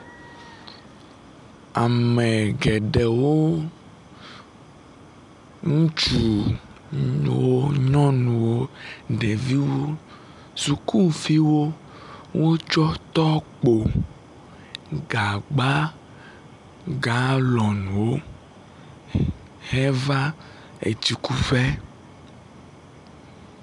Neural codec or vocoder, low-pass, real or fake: none; 10.8 kHz; real